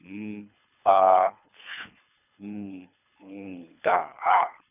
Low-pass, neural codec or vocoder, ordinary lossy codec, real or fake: 3.6 kHz; codec, 24 kHz, 3 kbps, HILCodec; none; fake